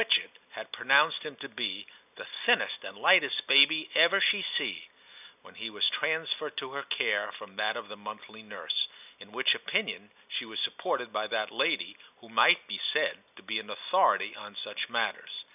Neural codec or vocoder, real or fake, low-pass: none; real; 3.6 kHz